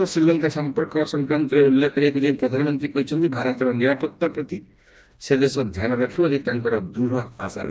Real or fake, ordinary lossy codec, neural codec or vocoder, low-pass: fake; none; codec, 16 kHz, 1 kbps, FreqCodec, smaller model; none